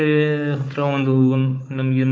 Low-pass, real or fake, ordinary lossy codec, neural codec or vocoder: none; fake; none; codec, 16 kHz, 4 kbps, FunCodec, trained on Chinese and English, 50 frames a second